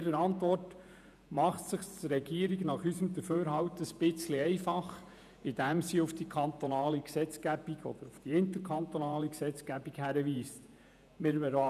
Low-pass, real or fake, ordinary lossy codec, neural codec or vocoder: 14.4 kHz; fake; none; vocoder, 48 kHz, 128 mel bands, Vocos